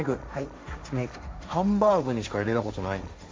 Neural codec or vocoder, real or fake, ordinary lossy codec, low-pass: codec, 16 kHz, 1.1 kbps, Voila-Tokenizer; fake; none; none